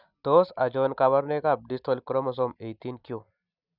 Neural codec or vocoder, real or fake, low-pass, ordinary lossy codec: none; real; 5.4 kHz; none